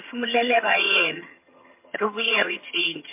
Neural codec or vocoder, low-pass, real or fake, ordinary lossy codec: vocoder, 22.05 kHz, 80 mel bands, HiFi-GAN; 3.6 kHz; fake; MP3, 24 kbps